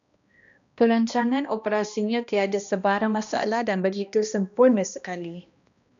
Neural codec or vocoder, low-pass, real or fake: codec, 16 kHz, 1 kbps, X-Codec, HuBERT features, trained on balanced general audio; 7.2 kHz; fake